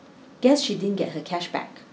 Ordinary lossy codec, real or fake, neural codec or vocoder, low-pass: none; real; none; none